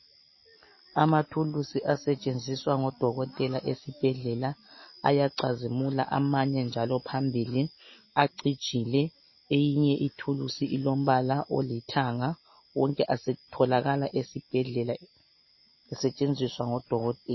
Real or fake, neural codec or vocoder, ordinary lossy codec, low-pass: real; none; MP3, 24 kbps; 7.2 kHz